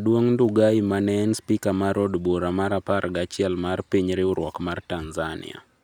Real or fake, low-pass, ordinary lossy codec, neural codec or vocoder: real; 19.8 kHz; none; none